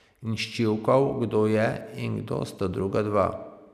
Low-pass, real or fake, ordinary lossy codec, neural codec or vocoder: 14.4 kHz; real; none; none